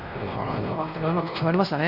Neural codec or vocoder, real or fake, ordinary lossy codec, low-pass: codec, 16 kHz, 1 kbps, X-Codec, WavLM features, trained on Multilingual LibriSpeech; fake; AAC, 48 kbps; 5.4 kHz